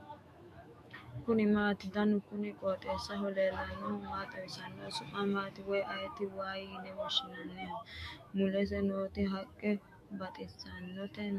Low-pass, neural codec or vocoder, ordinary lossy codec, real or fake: 14.4 kHz; autoencoder, 48 kHz, 128 numbers a frame, DAC-VAE, trained on Japanese speech; MP3, 64 kbps; fake